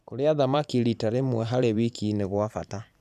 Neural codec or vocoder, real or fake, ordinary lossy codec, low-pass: vocoder, 44.1 kHz, 128 mel bands every 512 samples, BigVGAN v2; fake; none; 14.4 kHz